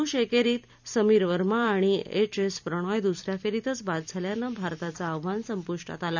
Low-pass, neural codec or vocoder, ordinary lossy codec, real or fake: 7.2 kHz; vocoder, 44.1 kHz, 128 mel bands every 256 samples, BigVGAN v2; none; fake